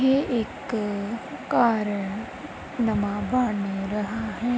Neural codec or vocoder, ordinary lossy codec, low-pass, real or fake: none; none; none; real